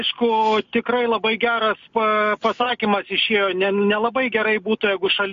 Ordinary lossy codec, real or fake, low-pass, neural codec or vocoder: MP3, 48 kbps; real; 7.2 kHz; none